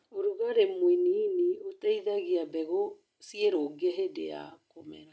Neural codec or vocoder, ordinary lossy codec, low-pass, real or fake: none; none; none; real